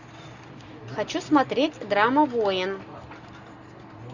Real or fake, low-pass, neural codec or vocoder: real; 7.2 kHz; none